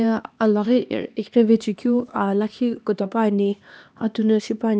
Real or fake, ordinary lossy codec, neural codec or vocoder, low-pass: fake; none; codec, 16 kHz, 2 kbps, X-Codec, HuBERT features, trained on LibriSpeech; none